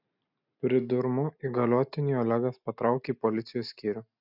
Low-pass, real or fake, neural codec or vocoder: 5.4 kHz; real; none